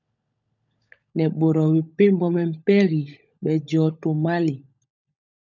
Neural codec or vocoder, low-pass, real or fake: codec, 16 kHz, 16 kbps, FunCodec, trained on LibriTTS, 50 frames a second; 7.2 kHz; fake